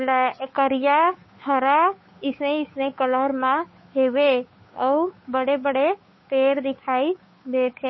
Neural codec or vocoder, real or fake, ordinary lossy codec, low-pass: codec, 16 kHz, 8 kbps, FunCodec, trained on Chinese and English, 25 frames a second; fake; MP3, 24 kbps; 7.2 kHz